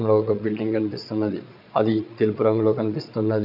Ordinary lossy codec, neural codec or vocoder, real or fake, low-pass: none; vocoder, 22.05 kHz, 80 mel bands, Vocos; fake; 5.4 kHz